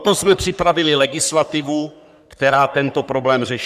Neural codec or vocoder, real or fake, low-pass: codec, 44.1 kHz, 3.4 kbps, Pupu-Codec; fake; 14.4 kHz